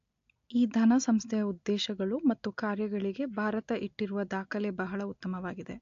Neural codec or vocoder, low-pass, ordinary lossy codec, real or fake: none; 7.2 kHz; AAC, 48 kbps; real